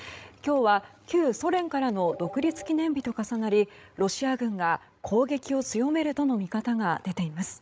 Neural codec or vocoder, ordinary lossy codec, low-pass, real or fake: codec, 16 kHz, 16 kbps, FreqCodec, larger model; none; none; fake